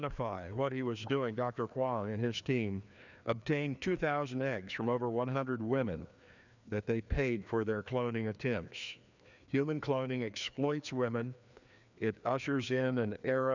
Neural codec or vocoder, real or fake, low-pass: codec, 16 kHz, 2 kbps, FreqCodec, larger model; fake; 7.2 kHz